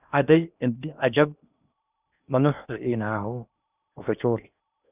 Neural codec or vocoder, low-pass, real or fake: codec, 16 kHz in and 24 kHz out, 0.8 kbps, FocalCodec, streaming, 65536 codes; 3.6 kHz; fake